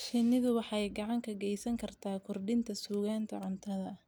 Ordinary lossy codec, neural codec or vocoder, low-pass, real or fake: none; none; none; real